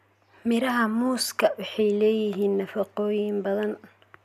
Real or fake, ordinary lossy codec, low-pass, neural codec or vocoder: real; none; 14.4 kHz; none